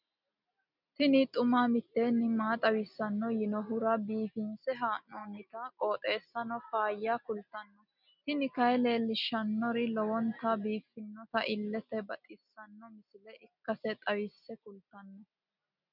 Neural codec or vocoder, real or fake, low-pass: none; real; 5.4 kHz